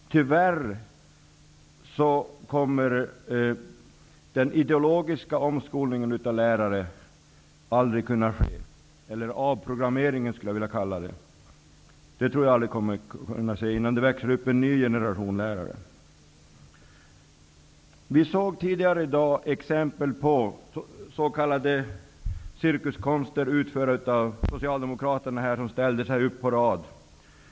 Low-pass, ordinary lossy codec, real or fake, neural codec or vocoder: none; none; real; none